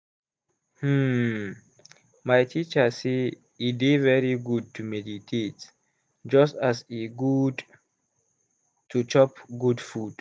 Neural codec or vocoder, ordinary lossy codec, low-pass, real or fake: none; none; none; real